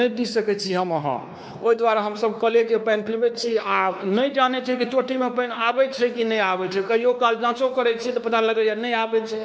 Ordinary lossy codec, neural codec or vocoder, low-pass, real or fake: none; codec, 16 kHz, 2 kbps, X-Codec, WavLM features, trained on Multilingual LibriSpeech; none; fake